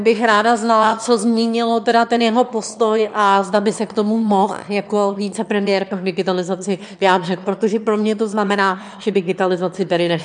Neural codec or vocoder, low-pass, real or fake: autoencoder, 22.05 kHz, a latent of 192 numbers a frame, VITS, trained on one speaker; 9.9 kHz; fake